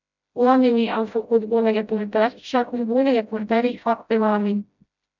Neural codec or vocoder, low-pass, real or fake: codec, 16 kHz, 0.5 kbps, FreqCodec, smaller model; 7.2 kHz; fake